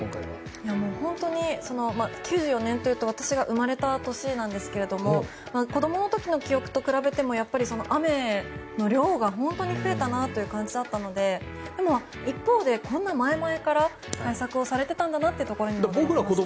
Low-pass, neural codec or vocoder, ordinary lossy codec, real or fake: none; none; none; real